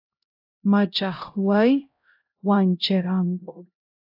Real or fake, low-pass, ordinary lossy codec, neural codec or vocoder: fake; 5.4 kHz; AAC, 48 kbps; codec, 16 kHz, 0.5 kbps, X-Codec, HuBERT features, trained on LibriSpeech